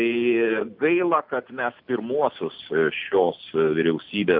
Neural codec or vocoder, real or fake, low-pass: none; real; 5.4 kHz